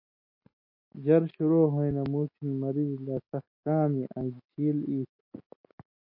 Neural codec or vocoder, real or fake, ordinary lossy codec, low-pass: none; real; MP3, 32 kbps; 5.4 kHz